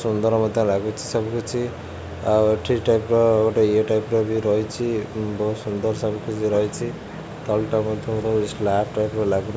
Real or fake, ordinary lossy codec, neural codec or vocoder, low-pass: real; none; none; none